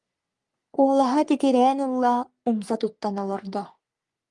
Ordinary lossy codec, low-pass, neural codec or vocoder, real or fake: Opus, 32 kbps; 10.8 kHz; codec, 44.1 kHz, 3.4 kbps, Pupu-Codec; fake